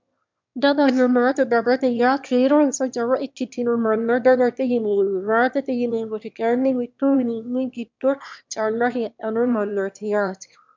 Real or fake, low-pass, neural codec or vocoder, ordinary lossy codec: fake; 7.2 kHz; autoencoder, 22.05 kHz, a latent of 192 numbers a frame, VITS, trained on one speaker; MP3, 64 kbps